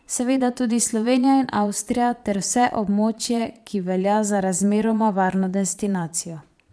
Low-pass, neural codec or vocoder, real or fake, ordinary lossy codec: none; vocoder, 22.05 kHz, 80 mel bands, Vocos; fake; none